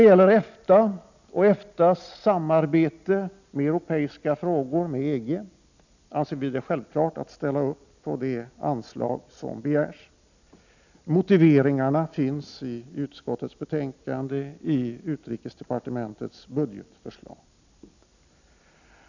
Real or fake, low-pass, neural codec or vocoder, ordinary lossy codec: real; 7.2 kHz; none; none